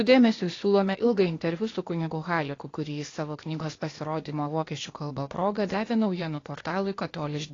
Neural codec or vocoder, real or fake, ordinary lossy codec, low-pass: codec, 16 kHz, 0.8 kbps, ZipCodec; fake; AAC, 32 kbps; 7.2 kHz